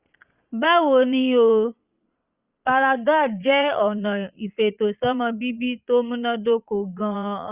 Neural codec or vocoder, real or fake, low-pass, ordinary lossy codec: vocoder, 22.05 kHz, 80 mel bands, Vocos; fake; 3.6 kHz; Opus, 64 kbps